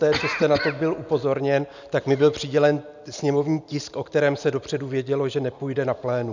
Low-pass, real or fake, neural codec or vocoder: 7.2 kHz; real; none